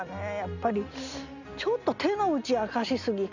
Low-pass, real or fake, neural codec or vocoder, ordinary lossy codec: 7.2 kHz; real; none; none